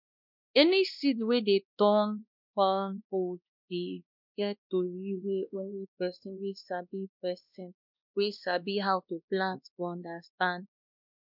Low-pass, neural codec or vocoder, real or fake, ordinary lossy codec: 5.4 kHz; codec, 16 kHz, 1 kbps, X-Codec, WavLM features, trained on Multilingual LibriSpeech; fake; none